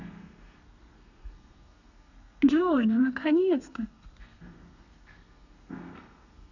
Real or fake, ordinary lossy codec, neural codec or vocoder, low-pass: fake; none; codec, 32 kHz, 1.9 kbps, SNAC; 7.2 kHz